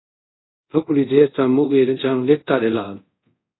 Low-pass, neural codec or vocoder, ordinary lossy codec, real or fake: 7.2 kHz; codec, 24 kHz, 0.5 kbps, DualCodec; AAC, 16 kbps; fake